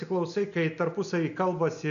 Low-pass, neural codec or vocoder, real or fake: 7.2 kHz; none; real